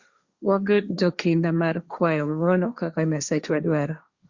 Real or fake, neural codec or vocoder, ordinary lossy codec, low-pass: fake; codec, 16 kHz, 1.1 kbps, Voila-Tokenizer; Opus, 64 kbps; 7.2 kHz